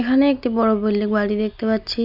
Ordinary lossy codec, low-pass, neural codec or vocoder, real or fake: none; 5.4 kHz; none; real